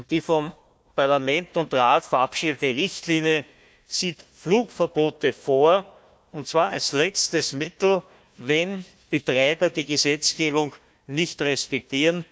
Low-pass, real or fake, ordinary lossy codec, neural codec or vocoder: none; fake; none; codec, 16 kHz, 1 kbps, FunCodec, trained on Chinese and English, 50 frames a second